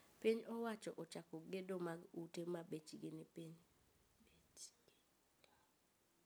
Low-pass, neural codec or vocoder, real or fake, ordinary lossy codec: none; none; real; none